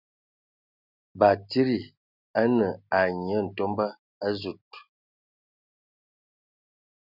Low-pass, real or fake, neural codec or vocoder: 5.4 kHz; real; none